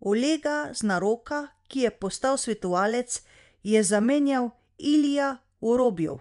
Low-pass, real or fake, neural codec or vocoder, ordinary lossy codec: 10.8 kHz; fake; vocoder, 24 kHz, 100 mel bands, Vocos; none